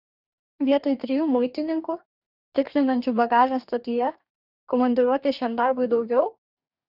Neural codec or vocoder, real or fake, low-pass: codec, 44.1 kHz, 2.6 kbps, DAC; fake; 5.4 kHz